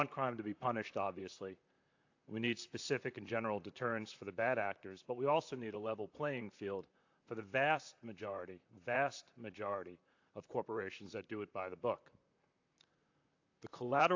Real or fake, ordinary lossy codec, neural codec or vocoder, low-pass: fake; AAC, 48 kbps; vocoder, 44.1 kHz, 128 mel bands, Pupu-Vocoder; 7.2 kHz